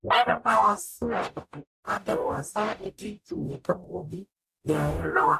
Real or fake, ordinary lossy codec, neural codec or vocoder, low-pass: fake; none; codec, 44.1 kHz, 0.9 kbps, DAC; 14.4 kHz